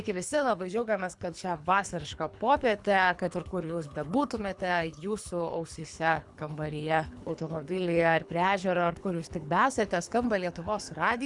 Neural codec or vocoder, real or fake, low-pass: codec, 24 kHz, 3 kbps, HILCodec; fake; 10.8 kHz